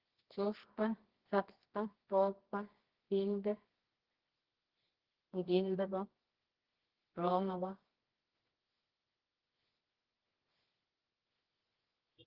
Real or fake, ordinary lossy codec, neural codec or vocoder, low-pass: fake; Opus, 16 kbps; codec, 24 kHz, 0.9 kbps, WavTokenizer, medium music audio release; 5.4 kHz